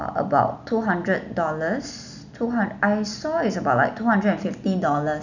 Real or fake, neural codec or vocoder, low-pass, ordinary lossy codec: real; none; 7.2 kHz; none